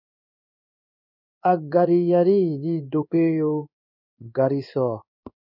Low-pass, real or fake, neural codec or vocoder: 5.4 kHz; fake; codec, 16 kHz in and 24 kHz out, 1 kbps, XY-Tokenizer